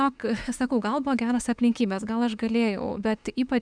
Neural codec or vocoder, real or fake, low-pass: autoencoder, 48 kHz, 32 numbers a frame, DAC-VAE, trained on Japanese speech; fake; 9.9 kHz